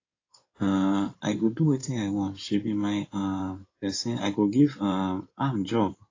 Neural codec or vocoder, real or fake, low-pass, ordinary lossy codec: codec, 16 kHz in and 24 kHz out, 2.2 kbps, FireRedTTS-2 codec; fake; 7.2 kHz; AAC, 32 kbps